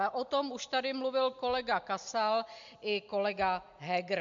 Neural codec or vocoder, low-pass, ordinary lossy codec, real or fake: none; 7.2 kHz; MP3, 64 kbps; real